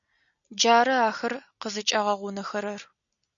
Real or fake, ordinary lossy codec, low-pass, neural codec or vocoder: real; MP3, 96 kbps; 7.2 kHz; none